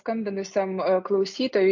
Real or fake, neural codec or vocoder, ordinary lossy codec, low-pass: real; none; MP3, 48 kbps; 7.2 kHz